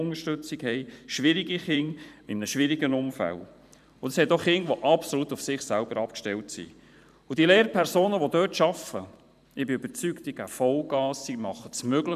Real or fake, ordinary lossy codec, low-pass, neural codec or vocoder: fake; none; 14.4 kHz; vocoder, 44.1 kHz, 128 mel bands every 512 samples, BigVGAN v2